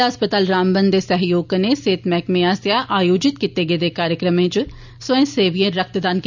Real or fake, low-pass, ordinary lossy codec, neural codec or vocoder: real; 7.2 kHz; none; none